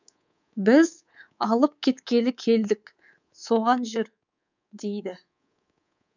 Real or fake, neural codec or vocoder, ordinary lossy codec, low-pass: fake; codec, 24 kHz, 3.1 kbps, DualCodec; none; 7.2 kHz